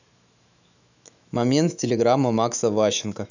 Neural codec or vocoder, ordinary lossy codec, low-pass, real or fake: autoencoder, 48 kHz, 128 numbers a frame, DAC-VAE, trained on Japanese speech; none; 7.2 kHz; fake